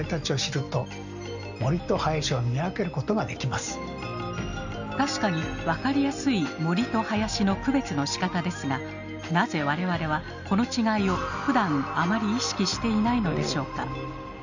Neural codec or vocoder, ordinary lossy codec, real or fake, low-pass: none; none; real; 7.2 kHz